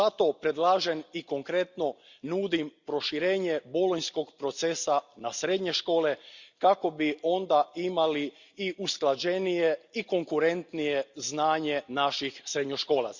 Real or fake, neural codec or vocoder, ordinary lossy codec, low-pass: real; none; Opus, 64 kbps; 7.2 kHz